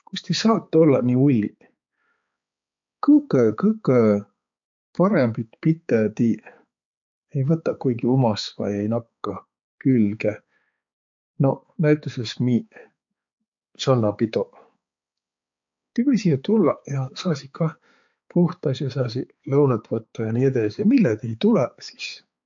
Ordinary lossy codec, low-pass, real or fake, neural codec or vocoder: MP3, 48 kbps; 7.2 kHz; fake; codec, 16 kHz, 4 kbps, X-Codec, HuBERT features, trained on balanced general audio